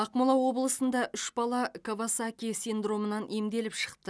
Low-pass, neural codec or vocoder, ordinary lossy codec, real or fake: none; none; none; real